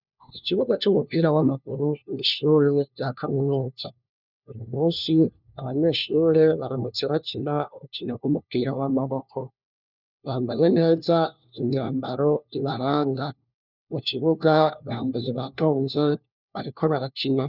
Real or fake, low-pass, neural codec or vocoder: fake; 5.4 kHz; codec, 16 kHz, 1 kbps, FunCodec, trained on LibriTTS, 50 frames a second